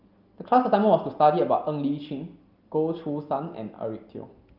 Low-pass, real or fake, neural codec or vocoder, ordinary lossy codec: 5.4 kHz; real; none; Opus, 24 kbps